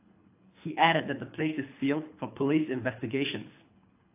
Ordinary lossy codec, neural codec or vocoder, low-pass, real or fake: AAC, 24 kbps; codec, 24 kHz, 3 kbps, HILCodec; 3.6 kHz; fake